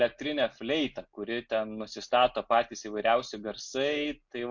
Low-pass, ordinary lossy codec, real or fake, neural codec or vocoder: 7.2 kHz; MP3, 48 kbps; real; none